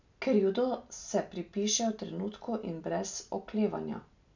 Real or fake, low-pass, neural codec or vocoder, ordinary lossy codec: real; 7.2 kHz; none; none